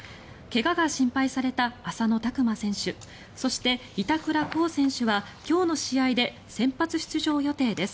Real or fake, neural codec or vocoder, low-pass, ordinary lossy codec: real; none; none; none